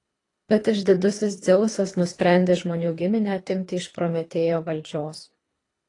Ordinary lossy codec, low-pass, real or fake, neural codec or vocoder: AAC, 32 kbps; 10.8 kHz; fake; codec, 24 kHz, 3 kbps, HILCodec